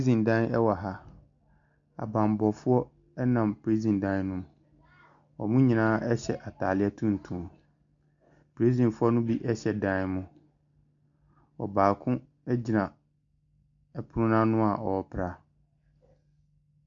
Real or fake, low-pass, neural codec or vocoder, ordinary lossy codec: real; 7.2 kHz; none; MP3, 64 kbps